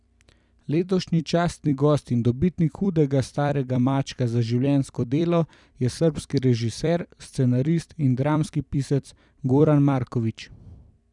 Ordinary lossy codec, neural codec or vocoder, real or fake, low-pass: none; vocoder, 44.1 kHz, 128 mel bands every 256 samples, BigVGAN v2; fake; 10.8 kHz